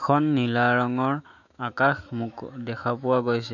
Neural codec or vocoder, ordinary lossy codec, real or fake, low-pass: autoencoder, 48 kHz, 128 numbers a frame, DAC-VAE, trained on Japanese speech; none; fake; 7.2 kHz